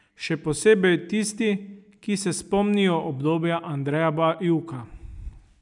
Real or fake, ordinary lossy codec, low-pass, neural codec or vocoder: real; none; 10.8 kHz; none